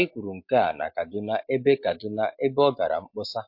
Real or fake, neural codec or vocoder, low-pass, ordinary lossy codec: real; none; 5.4 kHz; MP3, 32 kbps